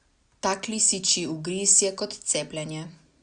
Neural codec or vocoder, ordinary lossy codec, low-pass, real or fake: none; Opus, 64 kbps; 9.9 kHz; real